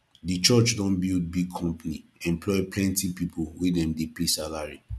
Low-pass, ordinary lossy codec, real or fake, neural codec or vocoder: none; none; real; none